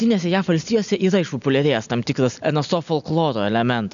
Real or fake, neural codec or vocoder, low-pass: real; none; 7.2 kHz